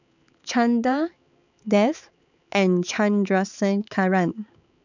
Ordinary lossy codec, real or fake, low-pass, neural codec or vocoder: none; fake; 7.2 kHz; codec, 16 kHz, 4 kbps, X-Codec, HuBERT features, trained on balanced general audio